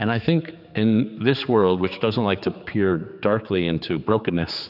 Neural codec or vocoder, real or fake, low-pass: codec, 16 kHz, 4 kbps, X-Codec, HuBERT features, trained on general audio; fake; 5.4 kHz